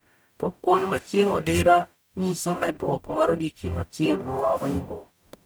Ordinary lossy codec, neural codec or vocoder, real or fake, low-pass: none; codec, 44.1 kHz, 0.9 kbps, DAC; fake; none